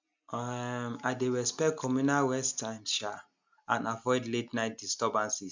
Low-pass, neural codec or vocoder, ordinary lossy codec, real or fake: 7.2 kHz; none; MP3, 64 kbps; real